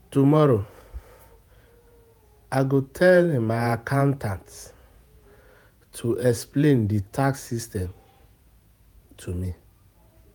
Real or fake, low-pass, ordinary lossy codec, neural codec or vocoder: fake; none; none; vocoder, 48 kHz, 128 mel bands, Vocos